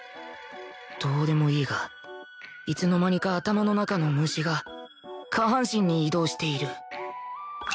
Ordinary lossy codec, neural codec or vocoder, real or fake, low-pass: none; none; real; none